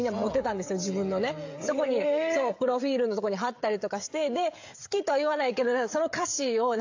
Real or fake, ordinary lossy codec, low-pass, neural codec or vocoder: fake; AAC, 48 kbps; 7.2 kHz; codec, 16 kHz, 16 kbps, FreqCodec, smaller model